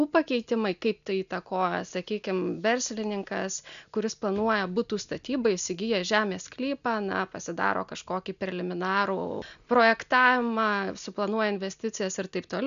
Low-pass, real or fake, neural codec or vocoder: 7.2 kHz; real; none